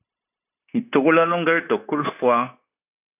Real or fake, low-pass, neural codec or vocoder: fake; 3.6 kHz; codec, 16 kHz, 0.9 kbps, LongCat-Audio-Codec